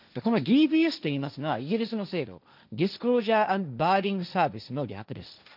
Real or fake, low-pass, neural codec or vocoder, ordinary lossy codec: fake; 5.4 kHz; codec, 16 kHz, 1.1 kbps, Voila-Tokenizer; none